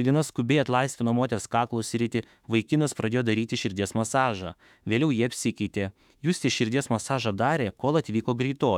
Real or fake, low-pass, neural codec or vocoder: fake; 19.8 kHz; autoencoder, 48 kHz, 32 numbers a frame, DAC-VAE, trained on Japanese speech